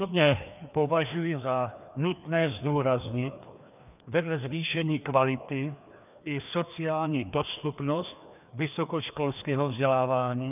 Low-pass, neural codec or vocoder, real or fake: 3.6 kHz; codec, 16 kHz, 2 kbps, FreqCodec, larger model; fake